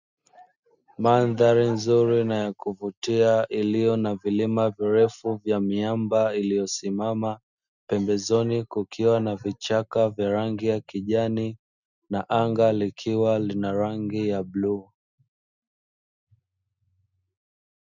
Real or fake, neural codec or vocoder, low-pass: real; none; 7.2 kHz